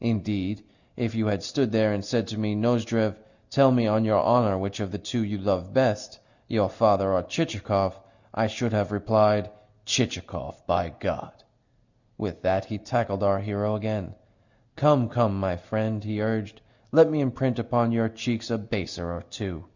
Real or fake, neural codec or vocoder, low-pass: real; none; 7.2 kHz